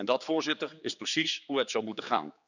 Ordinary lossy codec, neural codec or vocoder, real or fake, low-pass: none; codec, 16 kHz, 4 kbps, X-Codec, HuBERT features, trained on general audio; fake; 7.2 kHz